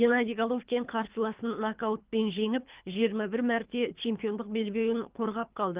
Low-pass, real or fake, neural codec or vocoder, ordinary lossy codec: 3.6 kHz; fake; codec, 24 kHz, 3 kbps, HILCodec; Opus, 32 kbps